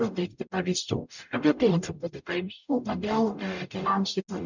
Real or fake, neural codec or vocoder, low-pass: fake; codec, 44.1 kHz, 0.9 kbps, DAC; 7.2 kHz